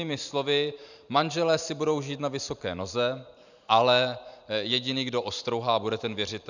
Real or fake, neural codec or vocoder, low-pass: fake; vocoder, 44.1 kHz, 128 mel bands every 512 samples, BigVGAN v2; 7.2 kHz